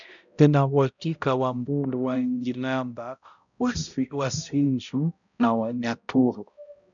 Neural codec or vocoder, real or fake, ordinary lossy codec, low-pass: codec, 16 kHz, 0.5 kbps, X-Codec, HuBERT features, trained on balanced general audio; fake; MP3, 96 kbps; 7.2 kHz